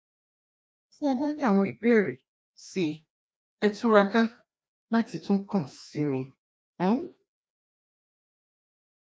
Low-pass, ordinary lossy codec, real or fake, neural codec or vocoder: none; none; fake; codec, 16 kHz, 1 kbps, FreqCodec, larger model